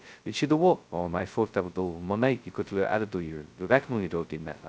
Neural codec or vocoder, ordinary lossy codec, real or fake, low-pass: codec, 16 kHz, 0.2 kbps, FocalCodec; none; fake; none